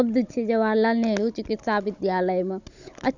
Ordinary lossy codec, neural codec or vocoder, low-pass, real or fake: none; codec, 16 kHz, 16 kbps, FunCodec, trained on Chinese and English, 50 frames a second; 7.2 kHz; fake